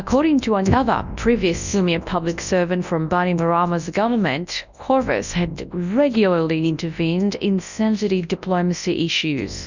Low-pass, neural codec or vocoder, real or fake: 7.2 kHz; codec, 24 kHz, 0.9 kbps, WavTokenizer, large speech release; fake